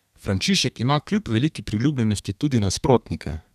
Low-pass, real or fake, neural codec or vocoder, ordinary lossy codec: 14.4 kHz; fake; codec, 32 kHz, 1.9 kbps, SNAC; none